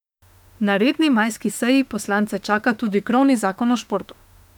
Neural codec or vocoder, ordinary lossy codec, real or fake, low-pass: autoencoder, 48 kHz, 32 numbers a frame, DAC-VAE, trained on Japanese speech; none; fake; 19.8 kHz